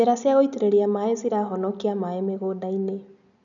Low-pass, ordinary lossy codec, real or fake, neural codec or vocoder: 7.2 kHz; none; real; none